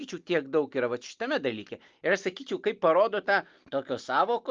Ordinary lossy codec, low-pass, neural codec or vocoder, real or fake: Opus, 24 kbps; 7.2 kHz; none; real